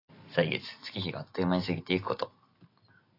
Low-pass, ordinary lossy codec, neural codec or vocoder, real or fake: 5.4 kHz; AAC, 32 kbps; none; real